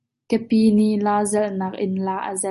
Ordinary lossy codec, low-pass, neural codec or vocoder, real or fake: MP3, 48 kbps; 14.4 kHz; none; real